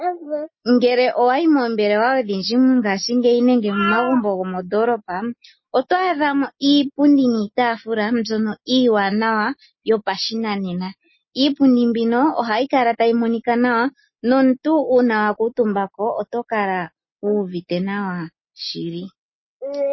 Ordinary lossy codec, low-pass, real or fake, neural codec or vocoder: MP3, 24 kbps; 7.2 kHz; real; none